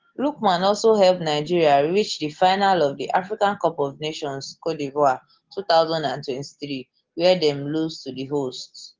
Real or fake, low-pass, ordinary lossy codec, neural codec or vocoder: real; 7.2 kHz; Opus, 16 kbps; none